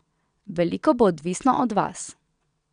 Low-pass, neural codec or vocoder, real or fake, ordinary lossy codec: 9.9 kHz; vocoder, 22.05 kHz, 80 mel bands, WaveNeXt; fake; none